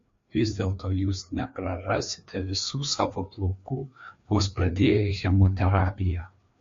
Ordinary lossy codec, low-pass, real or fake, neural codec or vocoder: MP3, 48 kbps; 7.2 kHz; fake; codec, 16 kHz, 2 kbps, FreqCodec, larger model